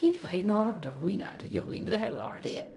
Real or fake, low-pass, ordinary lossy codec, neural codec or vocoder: fake; 10.8 kHz; none; codec, 16 kHz in and 24 kHz out, 0.4 kbps, LongCat-Audio-Codec, fine tuned four codebook decoder